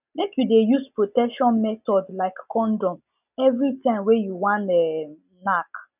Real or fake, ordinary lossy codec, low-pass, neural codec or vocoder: real; none; 3.6 kHz; none